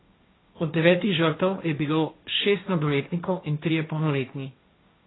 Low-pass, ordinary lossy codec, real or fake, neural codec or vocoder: 7.2 kHz; AAC, 16 kbps; fake; codec, 16 kHz, 1.1 kbps, Voila-Tokenizer